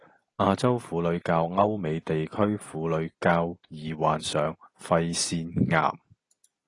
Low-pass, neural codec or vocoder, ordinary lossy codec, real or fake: 10.8 kHz; none; AAC, 32 kbps; real